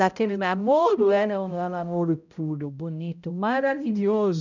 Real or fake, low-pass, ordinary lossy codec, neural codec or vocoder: fake; 7.2 kHz; none; codec, 16 kHz, 0.5 kbps, X-Codec, HuBERT features, trained on balanced general audio